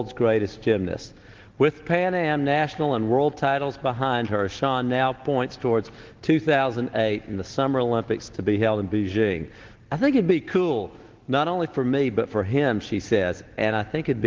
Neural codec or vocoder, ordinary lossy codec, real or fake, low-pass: none; Opus, 16 kbps; real; 7.2 kHz